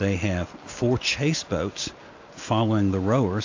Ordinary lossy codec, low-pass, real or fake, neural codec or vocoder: AAC, 48 kbps; 7.2 kHz; real; none